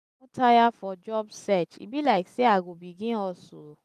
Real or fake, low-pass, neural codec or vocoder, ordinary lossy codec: real; 14.4 kHz; none; none